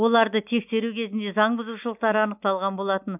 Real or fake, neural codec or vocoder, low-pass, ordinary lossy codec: real; none; 3.6 kHz; none